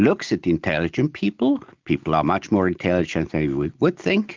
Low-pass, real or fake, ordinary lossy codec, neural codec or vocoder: 7.2 kHz; real; Opus, 24 kbps; none